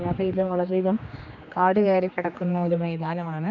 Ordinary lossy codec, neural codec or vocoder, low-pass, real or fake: none; codec, 16 kHz, 2 kbps, X-Codec, HuBERT features, trained on general audio; 7.2 kHz; fake